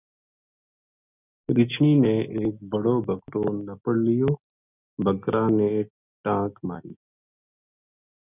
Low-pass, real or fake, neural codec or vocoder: 3.6 kHz; real; none